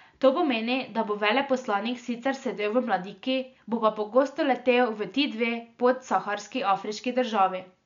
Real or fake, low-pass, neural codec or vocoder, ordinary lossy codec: real; 7.2 kHz; none; MP3, 64 kbps